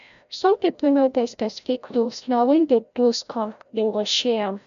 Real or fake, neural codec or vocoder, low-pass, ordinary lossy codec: fake; codec, 16 kHz, 0.5 kbps, FreqCodec, larger model; 7.2 kHz; none